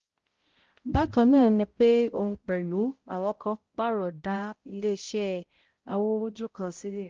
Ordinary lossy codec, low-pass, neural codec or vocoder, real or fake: Opus, 16 kbps; 7.2 kHz; codec, 16 kHz, 0.5 kbps, X-Codec, HuBERT features, trained on balanced general audio; fake